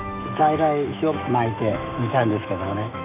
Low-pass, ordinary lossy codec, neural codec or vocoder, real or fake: 3.6 kHz; none; autoencoder, 48 kHz, 128 numbers a frame, DAC-VAE, trained on Japanese speech; fake